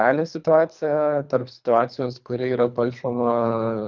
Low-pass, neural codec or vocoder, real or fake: 7.2 kHz; codec, 24 kHz, 3 kbps, HILCodec; fake